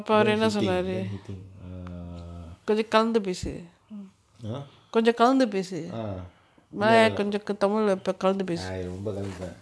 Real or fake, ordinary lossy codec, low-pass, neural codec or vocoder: real; none; none; none